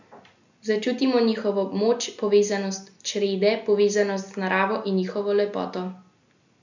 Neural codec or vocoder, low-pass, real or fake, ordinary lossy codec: none; 7.2 kHz; real; none